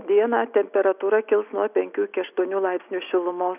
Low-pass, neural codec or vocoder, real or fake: 3.6 kHz; none; real